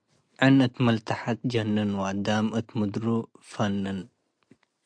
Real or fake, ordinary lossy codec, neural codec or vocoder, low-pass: real; AAC, 64 kbps; none; 9.9 kHz